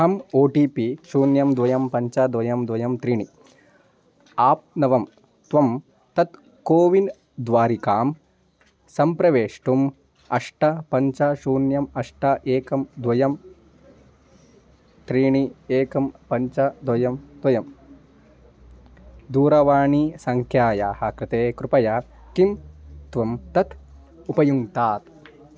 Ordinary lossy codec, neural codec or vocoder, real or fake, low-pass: none; none; real; none